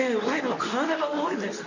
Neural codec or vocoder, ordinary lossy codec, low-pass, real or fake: codec, 24 kHz, 0.9 kbps, WavTokenizer, medium speech release version 2; none; 7.2 kHz; fake